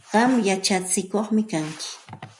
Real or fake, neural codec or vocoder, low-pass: real; none; 10.8 kHz